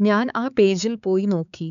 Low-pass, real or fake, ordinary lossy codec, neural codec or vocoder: 7.2 kHz; fake; none; codec, 16 kHz, 4 kbps, X-Codec, HuBERT features, trained on balanced general audio